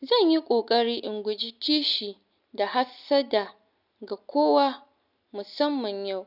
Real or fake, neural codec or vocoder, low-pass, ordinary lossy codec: real; none; 5.4 kHz; none